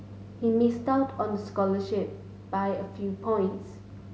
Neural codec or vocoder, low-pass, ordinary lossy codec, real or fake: none; none; none; real